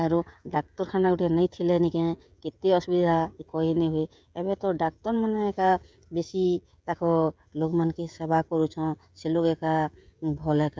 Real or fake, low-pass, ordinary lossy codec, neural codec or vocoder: fake; none; none; codec, 16 kHz, 16 kbps, FreqCodec, smaller model